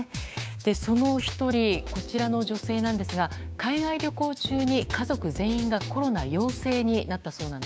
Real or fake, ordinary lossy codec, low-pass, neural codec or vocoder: fake; none; none; codec, 16 kHz, 6 kbps, DAC